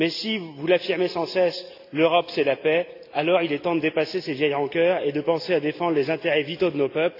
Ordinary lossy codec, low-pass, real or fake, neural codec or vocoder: AAC, 32 kbps; 5.4 kHz; real; none